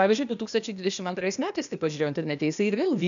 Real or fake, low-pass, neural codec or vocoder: fake; 7.2 kHz; codec, 16 kHz, 0.8 kbps, ZipCodec